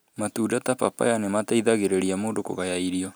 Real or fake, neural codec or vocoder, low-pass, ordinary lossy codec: real; none; none; none